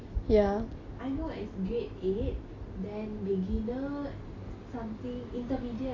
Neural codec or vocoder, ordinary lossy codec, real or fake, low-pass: none; AAC, 32 kbps; real; 7.2 kHz